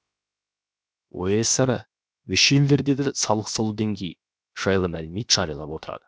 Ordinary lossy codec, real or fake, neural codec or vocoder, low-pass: none; fake; codec, 16 kHz, 0.7 kbps, FocalCodec; none